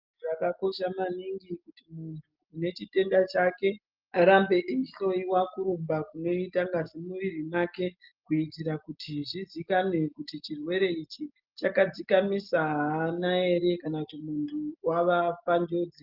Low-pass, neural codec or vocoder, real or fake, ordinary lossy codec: 5.4 kHz; none; real; Opus, 32 kbps